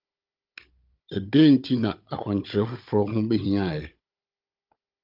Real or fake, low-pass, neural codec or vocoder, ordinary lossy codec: fake; 5.4 kHz; codec, 16 kHz, 16 kbps, FunCodec, trained on Chinese and English, 50 frames a second; Opus, 24 kbps